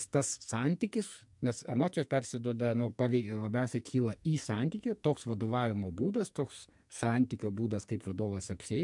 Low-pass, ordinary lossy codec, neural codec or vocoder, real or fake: 10.8 kHz; MP3, 64 kbps; codec, 44.1 kHz, 2.6 kbps, SNAC; fake